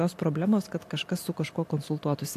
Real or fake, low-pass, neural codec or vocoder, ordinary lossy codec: real; 14.4 kHz; none; AAC, 64 kbps